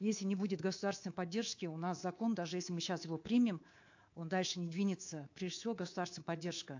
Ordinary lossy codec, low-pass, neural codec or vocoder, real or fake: AAC, 48 kbps; 7.2 kHz; codec, 24 kHz, 3.1 kbps, DualCodec; fake